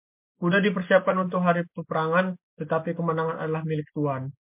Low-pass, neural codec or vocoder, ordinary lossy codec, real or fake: 3.6 kHz; none; MP3, 32 kbps; real